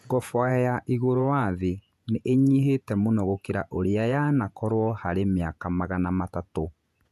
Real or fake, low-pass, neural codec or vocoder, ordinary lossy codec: fake; 14.4 kHz; vocoder, 48 kHz, 128 mel bands, Vocos; none